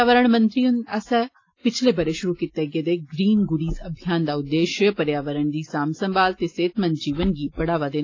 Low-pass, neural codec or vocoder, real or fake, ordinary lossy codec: 7.2 kHz; none; real; AAC, 32 kbps